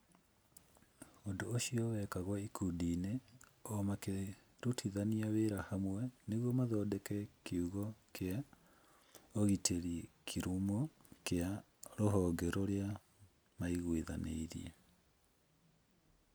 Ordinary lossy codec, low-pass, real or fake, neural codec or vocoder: none; none; real; none